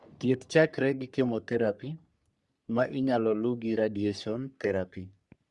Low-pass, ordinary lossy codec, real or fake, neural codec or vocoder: 10.8 kHz; Opus, 64 kbps; fake; codec, 44.1 kHz, 3.4 kbps, Pupu-Codec